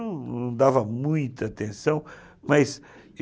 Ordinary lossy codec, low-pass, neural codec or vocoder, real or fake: none; none; none; real